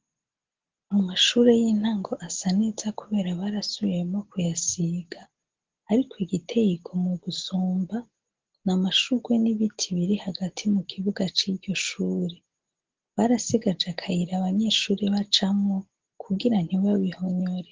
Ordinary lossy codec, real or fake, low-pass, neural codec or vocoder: Opus, 16 kbps; real; 7.2 kHz; none